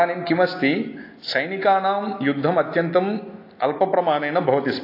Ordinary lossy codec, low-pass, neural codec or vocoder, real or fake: AAC, 32 kbps; 5.4 kHz; none; real